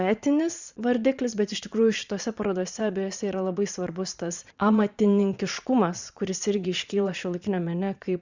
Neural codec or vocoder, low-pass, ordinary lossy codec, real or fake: vocoder, 22.05 kHz, 80 mel bands, Vocos; 7.2 kHz; Opus, 64 kbps; fake